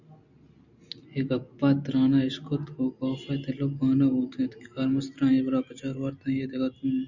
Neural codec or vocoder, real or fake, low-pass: none; real; 7.2 kHz